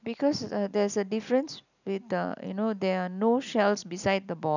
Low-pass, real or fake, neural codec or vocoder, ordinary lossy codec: 7.2 kHz; real; none; none